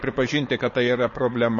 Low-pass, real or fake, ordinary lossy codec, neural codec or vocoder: 7.2 kHz; fake; MP3, 32 kbps; codec, 16 kHz, 4.8 kbps, FACodec